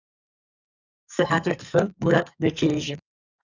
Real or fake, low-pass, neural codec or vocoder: fake; 7.2 kHz; codec, 44.1 kHz, 2.6 kbps, SNAC